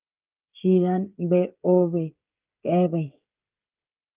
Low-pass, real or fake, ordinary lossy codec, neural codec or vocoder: 3.6 kHz; fake; Opus, 24 kbps; codec, 16 kHz, 16 kbps, FreqCodec, smaller model